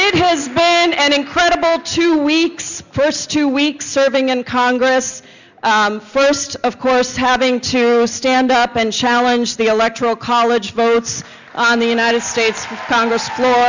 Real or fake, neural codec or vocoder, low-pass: real; none; 7.2 kHz